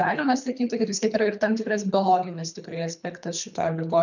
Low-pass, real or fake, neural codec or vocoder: 7.2 kHz; fake; codec, 24 kHz, 3 kbps, HILCodec